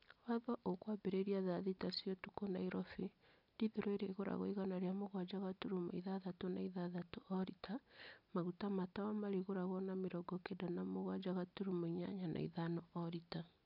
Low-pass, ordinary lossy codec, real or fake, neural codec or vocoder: 5.4 kHz; none; real; none